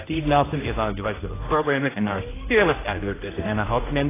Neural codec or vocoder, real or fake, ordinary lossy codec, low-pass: codec, 16 kHz, 0.5 kbps, X-Codec, HuBERT features, trained on general audio; fake; AAC, 16 kbps; 3.6 kHz